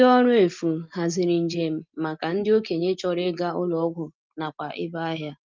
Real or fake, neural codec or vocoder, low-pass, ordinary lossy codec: real; none; 7.2 kHz; Opus, 24 kbps